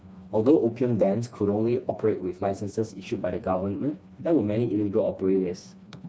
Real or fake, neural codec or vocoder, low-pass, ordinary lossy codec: fake; codec, 16 kHz, 2 kbps, FreqCodec, smaller model; none; none